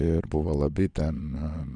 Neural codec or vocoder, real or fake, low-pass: vocoder, 22.05 kHz, 80 mel bands, Vocos; fake; 9.9 kHz